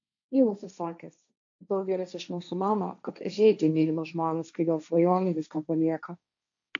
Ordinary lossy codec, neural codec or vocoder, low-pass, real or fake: MP3, 64 kbps; codec, 16 kHz, 1.1 kbps, Voila-Tokenizer; 7.2 kHz; fake